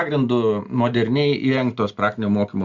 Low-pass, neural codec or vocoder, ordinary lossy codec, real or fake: 7.2 kHz; none; Opus, 64 kbps; real